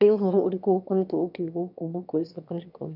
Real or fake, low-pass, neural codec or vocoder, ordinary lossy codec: fake; 5.4 kHz; autoencoder, 22.05 kHz, a latent of 192 numbers a frame, VITS, trained on one speaker; none